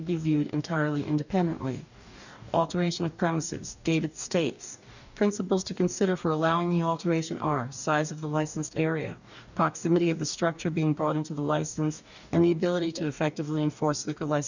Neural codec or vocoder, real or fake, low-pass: codec, 44.1 kHz, 2.6 kbps, DAC; fake; 7.2 kHz